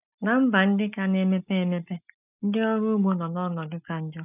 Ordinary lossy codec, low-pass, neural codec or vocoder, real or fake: none; 3.6 kHz; none; real